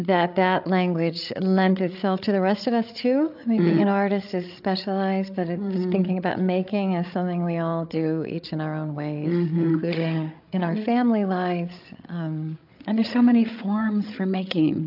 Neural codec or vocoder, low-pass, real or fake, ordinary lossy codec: codec, 16 kHz, 8 kbps, FreqCodec, larger model; 5.4 kHz; fake; AAC, 48 kbps